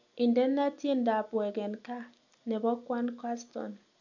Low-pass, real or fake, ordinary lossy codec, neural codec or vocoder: 7.2 kHz; real; none; none